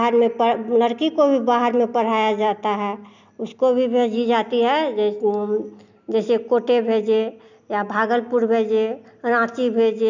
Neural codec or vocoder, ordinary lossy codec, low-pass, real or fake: none; none; 7.2 kHz; real